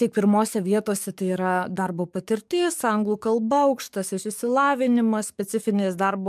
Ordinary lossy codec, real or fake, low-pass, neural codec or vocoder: MP3, 96 kbps; fake; 14.4 kHz; codec, 44.1 kHz, 7.8 kbps, DAC